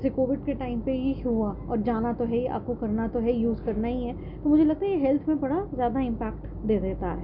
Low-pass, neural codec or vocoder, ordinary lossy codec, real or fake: 5.4 kHz; none; none; real